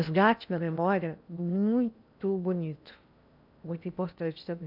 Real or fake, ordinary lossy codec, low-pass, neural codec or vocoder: fake; none; 5.4 kHz; codec, 16 kHz in and 24 kHz out, 0.8 kbps, FocalCodec, streaming, 65536 codes